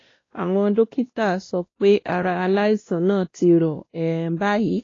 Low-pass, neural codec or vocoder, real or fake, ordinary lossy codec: 7.2 kHz; codec, 16 kHz, 1 kbps, X-Codec, WavLM features, trained on Multilingual LibriSpeech; fake; AAC, 32 kbps